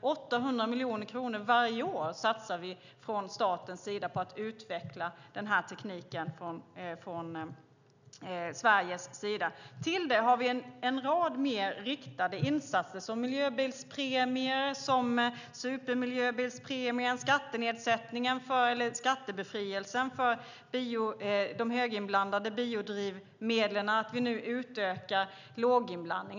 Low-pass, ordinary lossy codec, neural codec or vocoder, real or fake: 7.2 kHz; none; none; real